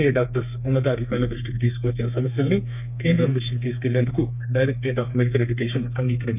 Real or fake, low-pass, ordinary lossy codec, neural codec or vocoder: fake; 3.6 kHz; none; codec, 32 kHz, 1.9 kbps, SNAC